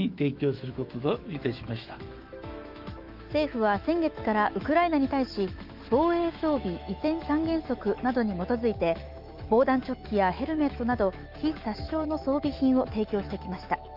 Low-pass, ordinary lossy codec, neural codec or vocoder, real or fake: 5.4 kHz; Opus, 24 kbps; autoencoder, 48 kHz, 128 numbers a frame, DAC-VAE, trained on Japanese speech; fake